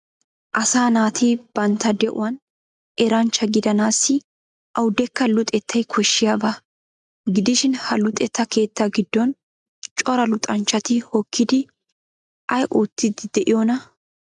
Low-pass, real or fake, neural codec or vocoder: 10.8 kHz; real; none